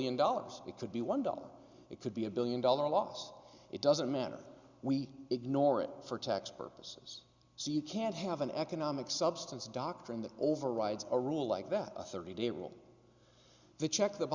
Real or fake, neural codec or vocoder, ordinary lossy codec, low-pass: real; none; Opus, 64 kbps; 7.2 kHz